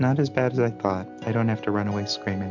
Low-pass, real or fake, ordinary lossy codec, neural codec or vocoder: 7.2 kHz; real; MP3, 64 kbps; none